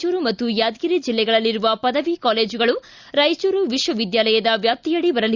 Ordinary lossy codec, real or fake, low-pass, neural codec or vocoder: Opus, 64 kbps; real; 7.2 kHz; none